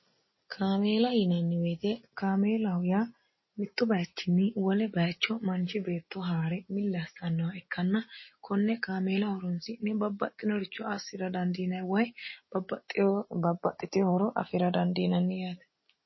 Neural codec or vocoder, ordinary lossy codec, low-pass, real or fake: none; MP3, 24 kbps; 7.2 kHz; real